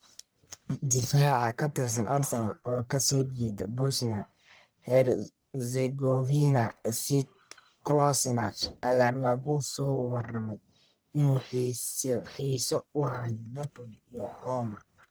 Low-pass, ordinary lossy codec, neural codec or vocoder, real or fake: none; none; codec, 44.1 kHz, 1.7 kbps, Pupu-Codec; fake